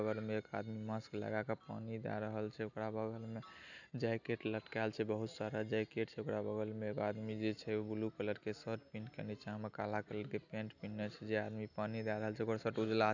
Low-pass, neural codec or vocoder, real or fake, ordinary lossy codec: 7.2 kHz; none; real; none